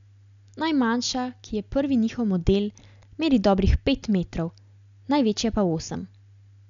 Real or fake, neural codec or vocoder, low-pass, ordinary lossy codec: real; none; 7.2 kHz; none